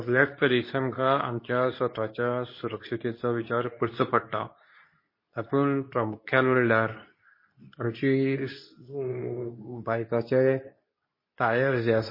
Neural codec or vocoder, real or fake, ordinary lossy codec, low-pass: codec, 24 kHz, 0.9 kbps, WavTokenizer, medium speech release version 2; fake; MP3, 24 kbps; 5.4 kHz